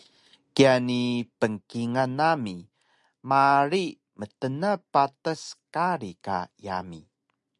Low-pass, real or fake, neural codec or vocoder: 10.8 kHz; real; none